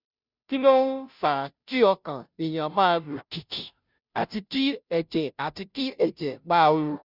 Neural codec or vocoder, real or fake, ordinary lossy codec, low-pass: codec, 16 kHz, 0.5 kbps, FunCodec, trained on Chinese and English, 25 frames a second; fake; none; 5.4 kHz